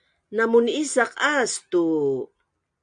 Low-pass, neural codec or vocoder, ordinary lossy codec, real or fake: 9.9 kHz; none; MP3, 64 kbps; real